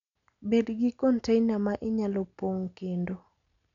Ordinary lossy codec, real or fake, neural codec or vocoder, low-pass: MP3, 96 kbps; real; none; 7.2 kHz